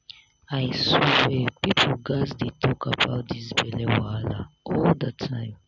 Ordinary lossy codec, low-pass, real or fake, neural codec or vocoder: none; 7.2 kHz; real; none